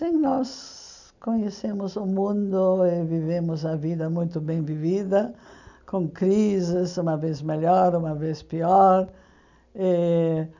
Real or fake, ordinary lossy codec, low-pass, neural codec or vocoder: real; none; 7.2 kHz; none